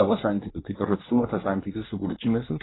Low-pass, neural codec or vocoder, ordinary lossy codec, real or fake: 7.2 kHz; codec, 24 kHz, 1 kbps, SNAC; AAC, 16 kbps; fake